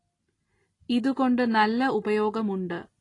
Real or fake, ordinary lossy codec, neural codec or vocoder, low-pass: real; AAC, 32 kbps; none; 10.8 kHz